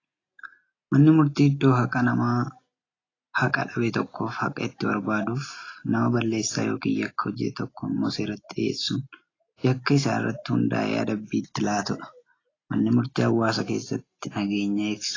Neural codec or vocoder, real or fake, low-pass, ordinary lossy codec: none; real; 7.2 kHz; AAC, 32 kbps